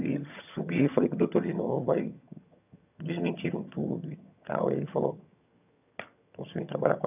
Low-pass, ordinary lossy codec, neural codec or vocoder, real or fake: 3.6 kHz; none; vocoder, 22.05 kHz, 80 mel bands, HiFi-GAN; fake